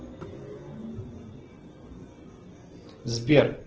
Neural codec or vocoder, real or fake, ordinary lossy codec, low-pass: none; real; Opus, 16 kbps; 7.2 kHz